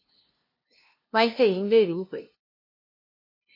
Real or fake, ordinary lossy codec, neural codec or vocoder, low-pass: fake; MP3, 32 kbps; codec, 16 kHz, 0.5 kbps, FunCodec, trained on LibriTTS, 25 frames a second; 5.4 kHz